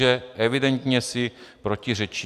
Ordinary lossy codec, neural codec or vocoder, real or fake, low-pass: AAC, 96 kbps; none; real; 14.4 kHz